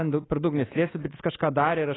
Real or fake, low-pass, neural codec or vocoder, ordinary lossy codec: fake; 7.2 kHz; codec, 24 kHz, 3.1 kbps, DualCodec; AAC, 16 kbps